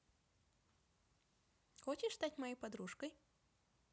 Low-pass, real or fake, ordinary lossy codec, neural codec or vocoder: none; real; none; none